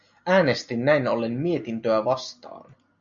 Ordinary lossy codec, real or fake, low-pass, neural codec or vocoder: MP3, 64 kbps; real; 7.2 kHz; none